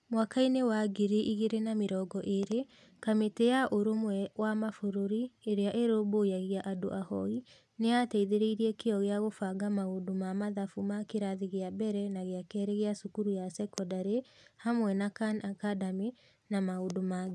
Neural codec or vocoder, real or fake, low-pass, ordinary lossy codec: none; real; none; none